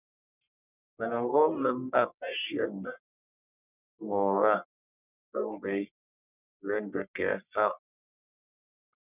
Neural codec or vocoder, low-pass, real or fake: codec, 44.1 kHz, 1.7 kbps, Pupu-Codec; 3.6 kHz; fake